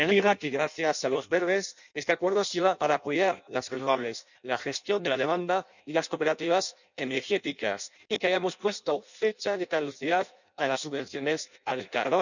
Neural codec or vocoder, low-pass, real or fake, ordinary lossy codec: codec, 16 kHz in and 24 kHz out, 0.6 kbps, FireRedTTS-2 codec; 7.2 kHz; fake; none